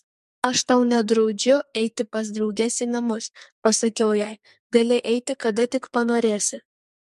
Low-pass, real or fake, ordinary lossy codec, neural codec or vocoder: 14.4 kHz; fake; MP3, 64 kbps; codec, 44.1 kHz, 2.6 kbps, SNAC